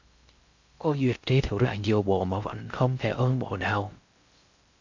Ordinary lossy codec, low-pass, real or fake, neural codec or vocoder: MP3, 64 kbps; 7.2 kHz; fake; codec, 16 kHz in and 24 kHz out, 0.6 kbps, FocalCodec, streaming, 4096 codes